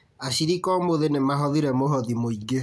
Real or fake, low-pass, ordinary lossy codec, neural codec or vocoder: real; none; none; none